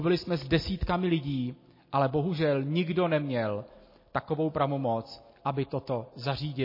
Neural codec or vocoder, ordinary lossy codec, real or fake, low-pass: none; MP3, 24 kbps; real; 5.4 kHz